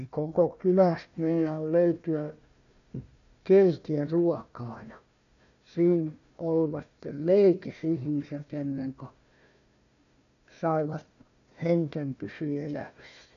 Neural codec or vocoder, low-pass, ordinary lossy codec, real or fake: codec, 16 kHz, 1 kbps, FunCodec, trained on Chinese and English, 50 frames a second; 7.2 kHz; none; fake